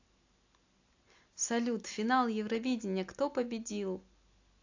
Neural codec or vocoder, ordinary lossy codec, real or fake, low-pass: none; AAC, 48 kbps; real; 7.2 kHz